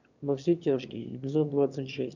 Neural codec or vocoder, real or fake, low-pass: autoencoder, 22.05 kHz, a latent of 192 numbers a frame, VITS, trained on one speaker; fake; 7.2 kHz